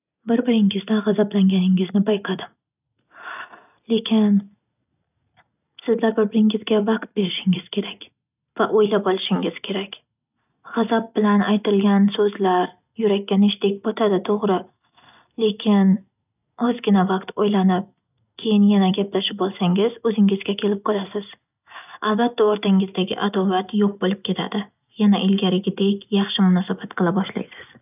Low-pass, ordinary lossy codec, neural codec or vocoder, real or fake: 3.6 kHz; none; none; real